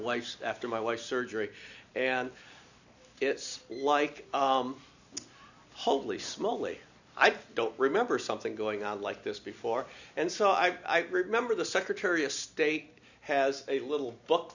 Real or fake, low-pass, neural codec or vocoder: real; 7.2 kHz; none